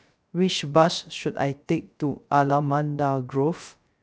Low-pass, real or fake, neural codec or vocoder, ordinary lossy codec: none; fake; codec, 16 kHz, 0.3 kbps, FocalCodec; none